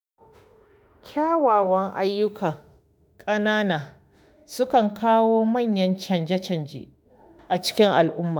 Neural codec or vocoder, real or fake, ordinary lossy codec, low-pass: autoencoder, 48 kHz, 32 numbers a frame, DAC-VAE, trained on Japanese speech; fake; none; none